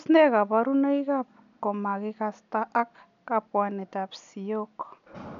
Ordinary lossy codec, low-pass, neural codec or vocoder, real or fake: none; 7.2 kHz; none; real